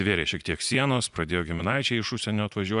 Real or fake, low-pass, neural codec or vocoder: fake; 10.8 kHz; vocoder, 24 kHz, 100 mel bands, Vocos